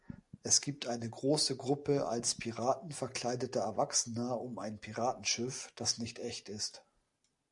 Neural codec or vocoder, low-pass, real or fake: none; 10.8 kHz; real